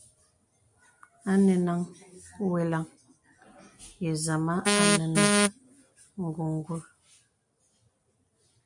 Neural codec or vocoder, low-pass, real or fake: none; 10.8 kHz; real